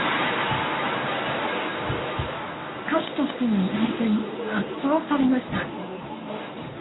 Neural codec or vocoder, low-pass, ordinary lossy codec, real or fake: codec, 24 kHz, 0.9 kbps, WavTokenizer, medium speech release version 1; 7.2 kHz; AAC, 16 kbps; fake